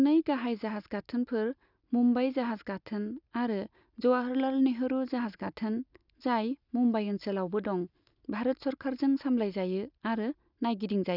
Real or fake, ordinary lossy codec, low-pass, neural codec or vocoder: real; none; 5.4 kHz; none